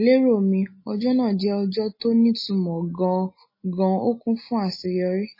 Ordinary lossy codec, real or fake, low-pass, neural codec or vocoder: MP3, 24 kbps; real; 5.4 kHz; none